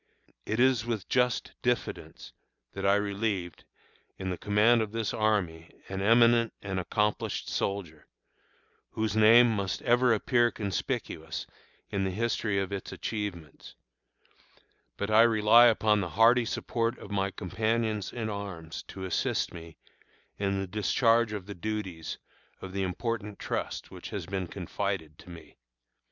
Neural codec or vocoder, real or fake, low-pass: none; real; 7.2 kHz